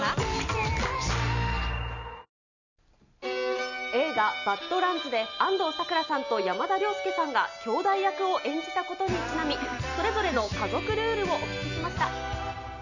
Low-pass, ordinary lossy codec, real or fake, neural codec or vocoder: 7.2 kHz; none; real; none